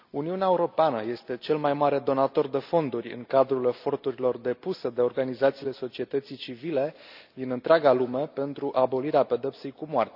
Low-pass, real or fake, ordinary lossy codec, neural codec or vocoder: 5.4 kHz; real; none; none